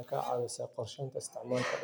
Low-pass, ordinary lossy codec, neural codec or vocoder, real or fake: none; none; none; real